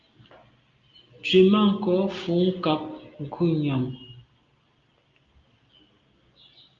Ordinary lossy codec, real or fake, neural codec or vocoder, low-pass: Opus, 32 kbps; real; none; 7.2 kHz